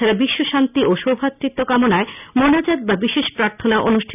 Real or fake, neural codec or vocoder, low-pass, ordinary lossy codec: real; none; 3.6 kHz; none